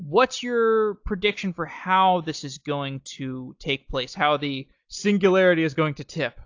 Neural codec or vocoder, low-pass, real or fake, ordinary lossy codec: none; 7.2 kHz; real; AAC, 48 kbps